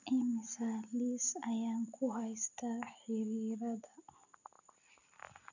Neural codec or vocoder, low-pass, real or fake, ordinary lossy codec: none; 7.2 kHz; real; none